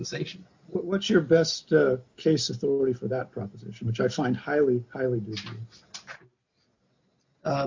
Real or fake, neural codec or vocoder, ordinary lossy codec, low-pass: real; none; MP3, 64 kbps; 7.2 kHz